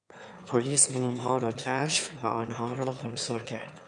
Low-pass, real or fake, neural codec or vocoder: 9.9 kHz; fake; autoencoder, 22.05 kHz, a latent of 192 numbers a frame, VITS, trained on one speaker